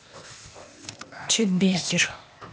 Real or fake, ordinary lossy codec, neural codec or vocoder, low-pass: fake; none; codec, 16 kHz, 0.8 kbps, ZipCodec; none